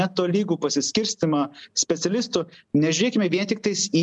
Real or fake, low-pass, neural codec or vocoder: real; 10.8 kHz; none